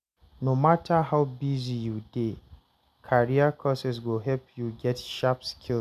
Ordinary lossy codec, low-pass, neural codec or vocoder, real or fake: none; 14.4 kHz; none; real